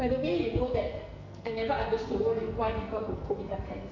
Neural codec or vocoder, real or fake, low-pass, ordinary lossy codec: codec, 44.1 kHz, 2.6 kbps, SNAC; fake; 7.2 kHz; none